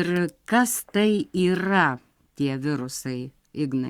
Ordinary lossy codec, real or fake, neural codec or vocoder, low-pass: Opus, 64 kbps; fake; codec, 44.1 kHz, 7.8 kbps, Pupu-Codec; 19.8 kHz